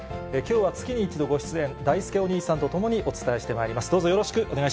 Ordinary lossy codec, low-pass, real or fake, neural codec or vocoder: none; none; real; none